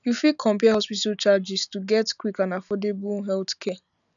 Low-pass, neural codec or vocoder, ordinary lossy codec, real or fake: 7.2 kHz; none; none; real